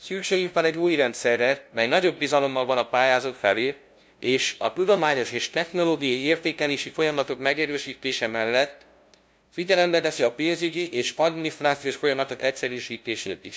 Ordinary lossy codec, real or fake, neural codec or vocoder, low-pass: none; fake; codec, 16 kHz, 0.5 kbps, FunCodec, trained on LibriTTS, 25 frames a second; none